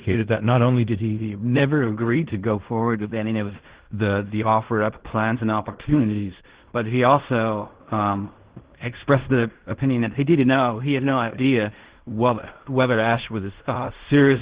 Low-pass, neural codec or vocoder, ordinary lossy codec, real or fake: 3.6 kHz; codec, 16 kHz in and 24 kHz out, 0.4 kbps, LongCat-Audio-Codec, fine tuned four codebook decoder; Opus, 16 kbps; fake